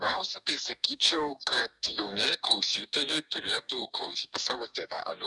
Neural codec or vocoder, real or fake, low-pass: codec, 44.1 kHz, 2.6 kbps, DAC; fake; 10.8 kHz